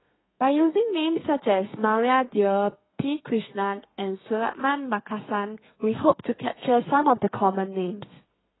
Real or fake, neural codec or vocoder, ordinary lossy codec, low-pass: fake; codec, 32 kHz, 1.9 kbps, SNAC; AAC, 16 kbps; 7.2 kHz